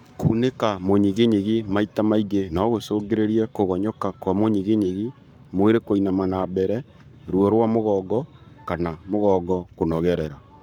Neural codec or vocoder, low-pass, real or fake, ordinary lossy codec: codec, 44.1 kHz, 7.8 kbps, Pupu-Codec; 19.8 kHz; fake; none